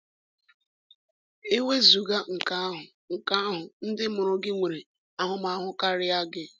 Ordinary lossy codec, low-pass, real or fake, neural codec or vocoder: none; none; real; none